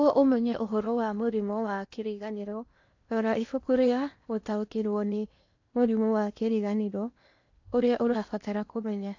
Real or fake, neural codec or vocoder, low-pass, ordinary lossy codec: fake; codec, 16 kHz in and 24 kHz out, 0.8 kbps, FocalCodec, streaming, 65536 codes; 7.2 kHz; AAC, 48 kbps